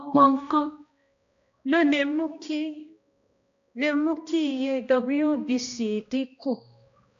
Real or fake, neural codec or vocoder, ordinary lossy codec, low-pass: fake; codec, 16 kHz, 1 kbps, X-Codec, HuBERT features, trained on balanced general audio; AAC, 64 kbps; 7.2 kHz